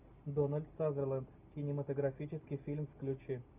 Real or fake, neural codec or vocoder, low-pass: real; none; 3.6 kHz